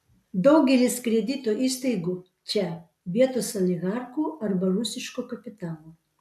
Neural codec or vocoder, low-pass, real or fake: none; 14.4 kHz; real